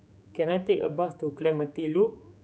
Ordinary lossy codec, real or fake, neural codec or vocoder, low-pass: none; fake; codec, 16 kHz, 4 kbps, X-Codec, HuBERT features, trained on general audio; none